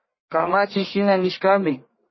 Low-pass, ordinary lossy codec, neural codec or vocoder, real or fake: 7.2 kHz; MP3, 24 kbps; codec, 44.1 kHz, 1.7 kbps, Pupu-Codec; fake